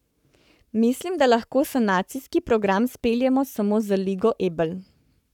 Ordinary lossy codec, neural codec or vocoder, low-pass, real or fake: none; codec, 44.1 kHz, 7.8 kbps, Pupu-Codec; 19.8 kHz; fake